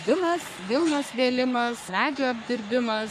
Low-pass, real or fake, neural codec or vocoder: 14.4 kHz; fake; codec, 44.1 kHz, 3.4 kbps, Pupu-Codec